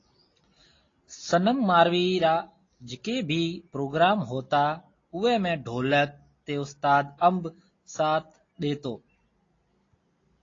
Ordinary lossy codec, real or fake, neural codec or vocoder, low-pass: AAC, 32 kbps; real; none; 7.2 kHz